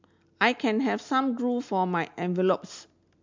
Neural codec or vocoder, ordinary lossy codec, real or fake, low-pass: none; MP3, 64 kbps; real; 7.2 kHz